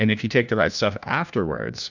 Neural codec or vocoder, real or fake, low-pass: codec, 16 kHz, 1 kbps, FunCodec, trained on LibriTTS, 50 frames a second; fake; 7.2 kHz